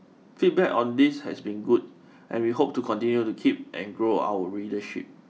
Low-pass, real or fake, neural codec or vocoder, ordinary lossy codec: none; real; none; none